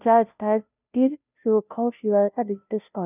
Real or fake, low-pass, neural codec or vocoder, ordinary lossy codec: fake; 3.6 kHz; codec, 16 kHz, 0.5 kbps, FunCodec, trained on Chinese and English, 25 frames a second; none